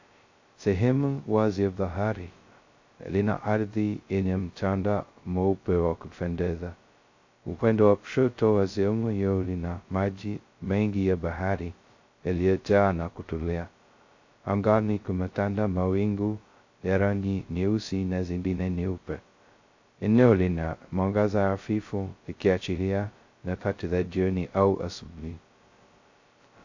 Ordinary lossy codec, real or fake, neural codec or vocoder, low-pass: AAC, 48 kbps; fake; codec, 16 kHz, 0.2 kbps, FocalCodec; 7.2 kHz